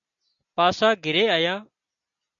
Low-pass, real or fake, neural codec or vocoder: 7.2 kHz; real; none